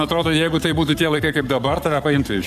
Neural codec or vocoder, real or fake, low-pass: codec, 44.1 kHz, 7.8 kbps, Pupu-Codec; fake; 14.4 kHz